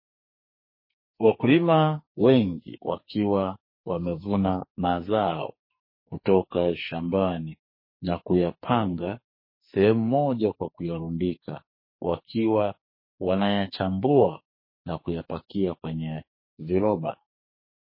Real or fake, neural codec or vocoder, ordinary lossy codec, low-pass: fake; codec, 44.1 kHz, 2.6 kbps, SNAC; MP3, 24 kbps; 5.4 kHz